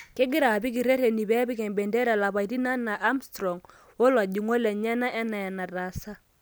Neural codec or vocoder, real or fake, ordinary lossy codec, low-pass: none; real; none; none